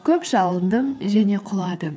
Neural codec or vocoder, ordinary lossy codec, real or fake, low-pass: codec, 16 kHz, 4 kbps, FreqCodec, larger model; none; fake; none